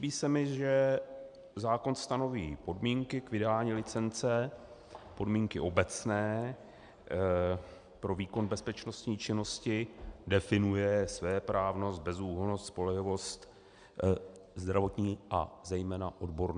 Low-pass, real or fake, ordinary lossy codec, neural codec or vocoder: 9.9 kHz; real; MP3, 96 kbps; none